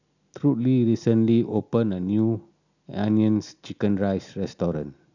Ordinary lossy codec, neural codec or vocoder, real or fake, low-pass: none; none; real; 7.2 kHz